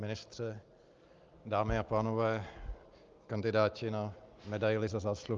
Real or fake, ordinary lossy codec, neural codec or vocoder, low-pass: real; Opus, 32 kbps; none; 7.2 kHz